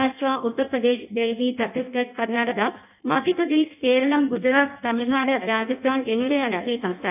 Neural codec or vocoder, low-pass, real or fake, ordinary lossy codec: codec, 16 kHz in and 24 kHz out, 0.6 kbps, FireRedTTS-2 codec; 3.6 kHz; fake; none